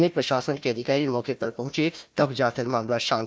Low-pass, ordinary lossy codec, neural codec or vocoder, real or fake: none; none; codec, 16 kHz, 1 kbps, FunCodec, trained on Chinese and English, 50 frames a second; fake